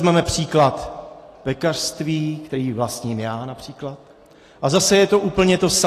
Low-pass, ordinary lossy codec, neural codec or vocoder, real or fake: 14.4 kHz; AAC, 48 kbps; none; real